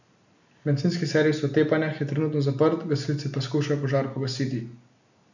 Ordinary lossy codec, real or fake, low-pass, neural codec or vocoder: none; real; 7.2 kHz; none